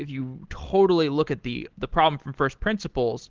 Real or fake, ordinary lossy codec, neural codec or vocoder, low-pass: real; Opus, 16 kbps; none; 7.2 kHz